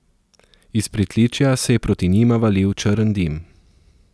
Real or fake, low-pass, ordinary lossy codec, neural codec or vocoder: real; none; none; none